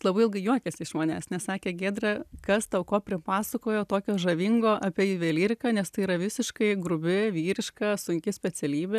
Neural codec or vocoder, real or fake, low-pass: none; real; 14.4 kHz